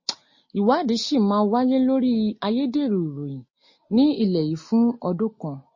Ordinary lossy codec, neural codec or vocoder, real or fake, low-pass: MP3, 32 kbps; none; real; 7.2 kHz